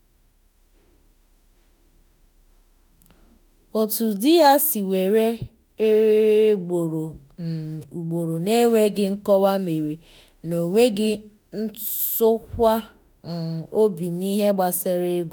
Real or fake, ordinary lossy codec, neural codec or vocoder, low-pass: fake; none; autoencoder, 48 kHz, 32 numbers a frame, DAC-VAE, trained on Japanese speech; none